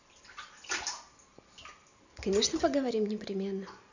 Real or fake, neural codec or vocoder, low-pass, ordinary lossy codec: real; none; 7.2 kHz; none